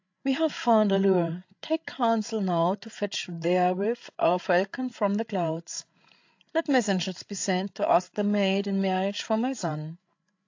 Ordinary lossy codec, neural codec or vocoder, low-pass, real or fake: AAC, 48 kbps; codec, 16 kHz, 8 kbps, FreqCodec, larger model; 7.2 kHz; fake